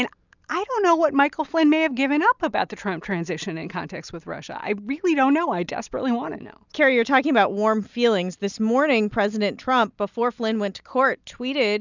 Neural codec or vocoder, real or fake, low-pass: none; real; 7.2 kHz